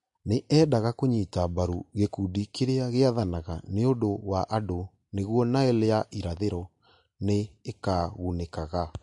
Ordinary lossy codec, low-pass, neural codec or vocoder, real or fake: MP3, 48 kbps; 10.8 kHz; none; real